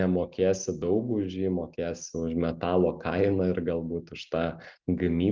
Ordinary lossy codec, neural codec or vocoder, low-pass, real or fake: Opus, 24 kbps; none; 7.2 kHz; real